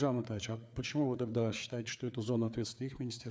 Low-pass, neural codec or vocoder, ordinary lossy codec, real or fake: none; codec, 16 kHz, 4 kbps, FreqCodec, larger model; none; fake